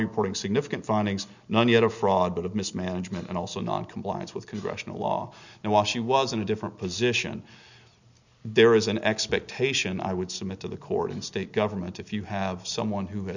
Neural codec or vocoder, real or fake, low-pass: none; real; 7.2 kHz